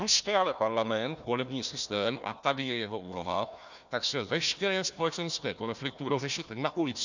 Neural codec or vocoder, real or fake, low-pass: codec, 16 kHz, 1 kbps, FunCodec, trained on Chinese and English, 50 frames a second; fake; 7.2 kHz